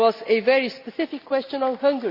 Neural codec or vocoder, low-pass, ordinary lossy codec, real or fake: none; 5.4 kHz; Opus, 64 kbps; real